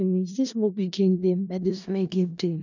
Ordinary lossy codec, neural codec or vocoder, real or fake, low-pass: none; codec, 16 kHz in and 24 kHz out, 0.4 kbps, LongCat-Audio-Codec, four codebook decoder; fake; 7.2 kHz